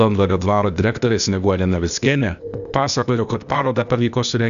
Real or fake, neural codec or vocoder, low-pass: fake; codec, 16 kHz, 0.8 kbps, ZipCodec; 7.2 kHz